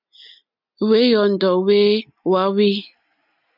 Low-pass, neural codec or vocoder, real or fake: 5.4 kHz; none; real